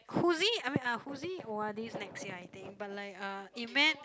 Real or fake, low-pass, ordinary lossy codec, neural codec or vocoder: real; none; none; none